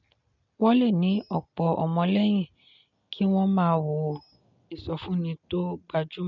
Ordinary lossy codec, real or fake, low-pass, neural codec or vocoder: none; real; 7.2 kHz; none